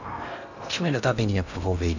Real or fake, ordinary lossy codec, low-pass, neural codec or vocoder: fake; none; 7.2 kHz; codec, 16 kHz in and 24 kHz out, 0.8 kbps, FocalCodec, streaming, 65536 codes